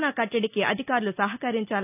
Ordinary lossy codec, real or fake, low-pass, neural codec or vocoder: none; real; 3.6 kHz; none